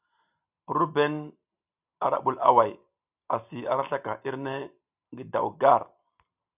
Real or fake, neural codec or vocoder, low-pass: real; none; 3.6 kHz